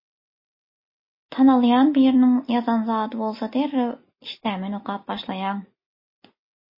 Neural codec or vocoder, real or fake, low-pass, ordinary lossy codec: none; real; 5.4 kHz; MP3, 24 kbps